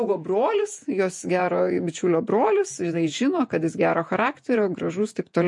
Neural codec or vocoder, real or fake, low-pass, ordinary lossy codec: vocoder, 48 kHz, 128 mel bands, Vocos; fake; 10.8 kHz; MP3, 48 kbps